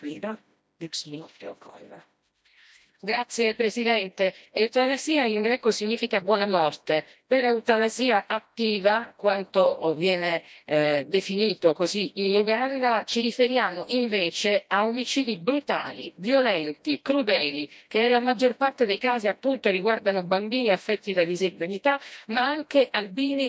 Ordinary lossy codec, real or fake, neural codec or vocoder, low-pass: none; fake; codec, 16 kHz, 1 kbps, FreqCodec, smaller model; none